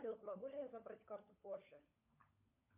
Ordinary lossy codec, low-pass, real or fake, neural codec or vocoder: MP3, 32 kbps; 3.6 kHz; fake; codec, 16 kHz, 16 kbps, FunCodec, trained on LibriTTS, 50 frames a second